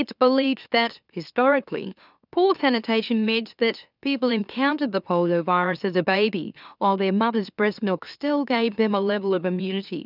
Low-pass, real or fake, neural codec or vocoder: 5.4 kHz; fake; autoencoder, 44.1 kHz, a latent of 192 numbers a frame, MeloTTS